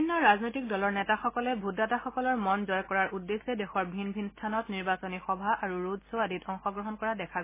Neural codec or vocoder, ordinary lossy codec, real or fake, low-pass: none; MP3, 16 kbps; real; 3.6 kHz